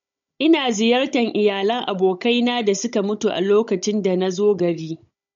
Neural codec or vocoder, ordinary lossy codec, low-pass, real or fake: codec, 16 kHz, 16 kbps, FunCodec, trained on Chinese and English, 50 frames a second; MP3, 48 kbps; 7.2 kHz; fake